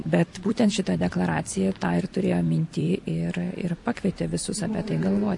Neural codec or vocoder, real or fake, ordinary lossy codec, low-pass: vocoder, 44.1 kHz, 128 mel bands every 256 samples, BigVGAN v2; fake; MP3, 48 kbps; 10.8 kHz